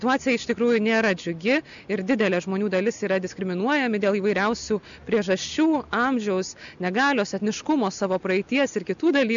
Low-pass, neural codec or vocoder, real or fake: 7.2 kHz; none; real